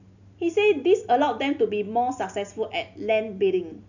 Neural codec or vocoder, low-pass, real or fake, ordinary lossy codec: none; 7.2 kHz; real; none